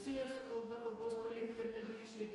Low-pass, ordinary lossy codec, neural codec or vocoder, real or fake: 14.4 kHz; MP3, 48 kbps; codec, 44.1 kHz, 2.6 kbps, DAC; fake